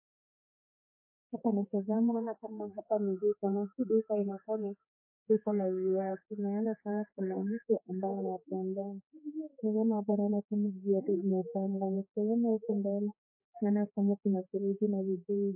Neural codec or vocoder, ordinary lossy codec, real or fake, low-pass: codec, 16 kHz, 4 kbps, X-Codec, HuBERT features, trained on general audio; MP3, 16 kbps; fake; 3.6 kHz